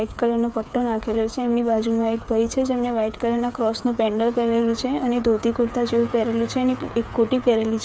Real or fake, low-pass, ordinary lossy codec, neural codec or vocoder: fake; none; none; codec, 16 kHz, 8 kbps, FreqCodec, smaller model